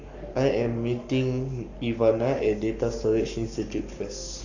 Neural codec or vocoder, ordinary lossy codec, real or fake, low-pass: codec, 44.1 kHz, 7.8 kbps, Pupu-Codec; none; fake; 7.2 kHz